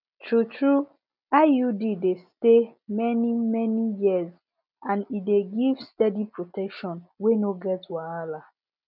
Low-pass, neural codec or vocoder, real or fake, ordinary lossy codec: 5.4 kHz; none; real; none